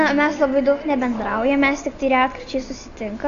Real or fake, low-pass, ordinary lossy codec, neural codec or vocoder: real; 7.2 kHz; AAC, 48 kbps; none